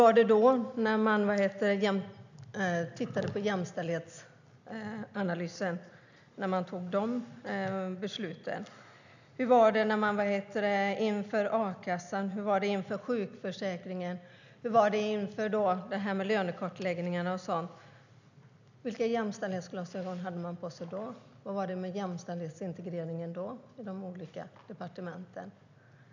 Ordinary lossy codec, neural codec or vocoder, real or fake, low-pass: none; none; real; 7.2 kHz